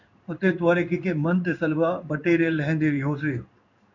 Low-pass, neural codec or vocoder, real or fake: 7.2 kHz; codec, 16 kHz in and 24 kHz out, 1 kbps, XY-Tokenizer; fake